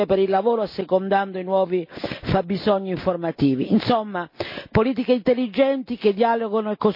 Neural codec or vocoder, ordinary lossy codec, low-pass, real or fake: none; MP3, 32 kbps; 5.4 kHz; real